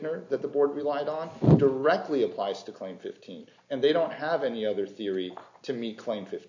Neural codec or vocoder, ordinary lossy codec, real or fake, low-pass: none; AAC, 48 kbps; real; 7.2 kHz